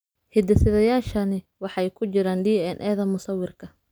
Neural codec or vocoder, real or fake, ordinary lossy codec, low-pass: none; real; none; none